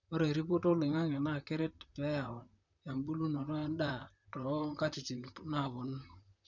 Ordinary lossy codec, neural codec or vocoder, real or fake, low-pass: none; vocoder, 44.1 kHz, 128 mel bands, Pupu-Vocoder; fake; 7.2 kHz